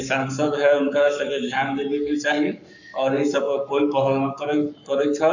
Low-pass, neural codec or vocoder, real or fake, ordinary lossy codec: 7.2 kHz; codec, 44.1 kHz, 7.8 kbps, Pupu-Codec; fake; none